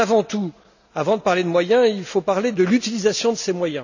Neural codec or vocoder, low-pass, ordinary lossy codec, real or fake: none; 7.2 kHz; none; real